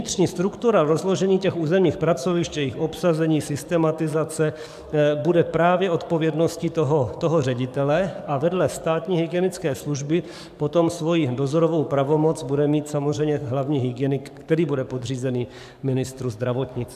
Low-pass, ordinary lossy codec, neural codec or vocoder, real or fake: 14.4 kHz; AAC, 96 kbps; codec, 44.1 kHz, 7.8 kbps, DAC; fake